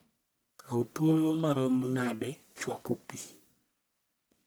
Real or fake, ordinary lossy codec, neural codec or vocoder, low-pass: fake; none; codec, 44.1 kHz, 1.7 kbps, Pupu-Codec; none